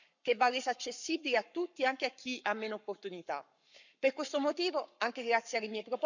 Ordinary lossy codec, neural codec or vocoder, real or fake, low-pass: none; codec, 44.1 kHz, 7.8 kbps, Pupu-Codec; fake; 7.2 kHz